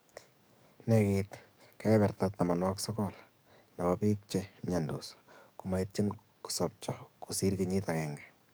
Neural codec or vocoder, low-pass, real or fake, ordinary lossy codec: codec, 44.1 kHz, 7.8 kbps, DAC; none; fake; none